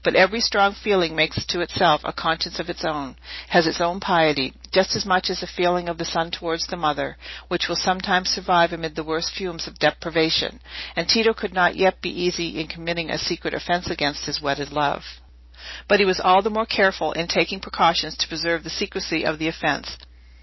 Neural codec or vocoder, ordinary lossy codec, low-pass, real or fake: none; MP3, 24 kbps; 7.2 kHz; real